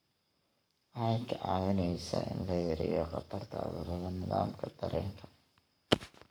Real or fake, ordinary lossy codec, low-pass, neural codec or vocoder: fake; none; none; codec, 44.1 kHz, 7.8 kbps, Pupu-Codec